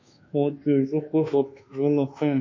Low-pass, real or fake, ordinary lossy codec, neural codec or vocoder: 7.2 kHz; fake; MP3, 64 kbps; codec, 24 kHz, 1.2 kbps, DualCodec